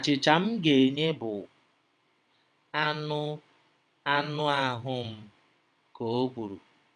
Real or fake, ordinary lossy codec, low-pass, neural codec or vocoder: fake; none; 9.9 kHz; vocoder, 22.05 kHz, 80 mel bands, WaveNeXt